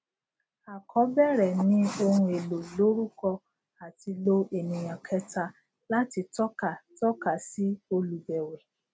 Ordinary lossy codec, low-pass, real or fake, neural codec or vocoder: none; none; real; none